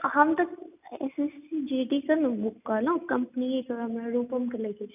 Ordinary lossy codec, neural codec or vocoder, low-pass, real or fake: none; none; 3.6 kHz; real